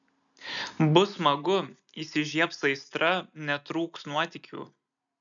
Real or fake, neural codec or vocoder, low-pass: real; none; 7.2 kHz